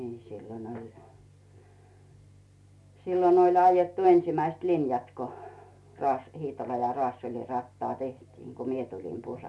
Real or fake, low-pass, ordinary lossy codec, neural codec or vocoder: real; none; none; none